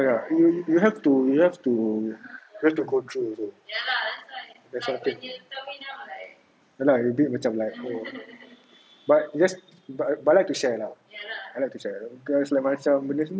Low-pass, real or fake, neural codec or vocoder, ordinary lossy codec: none; real; none; none